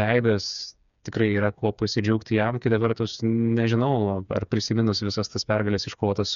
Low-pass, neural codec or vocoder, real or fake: 7.2 kHz; codec, 16 kHz, 4 kbps, FreqCodec, smaller model; fake